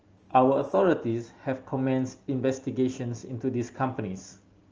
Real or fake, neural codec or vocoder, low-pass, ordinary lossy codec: real; none; 7.2 kHz; Opus, 24 kbps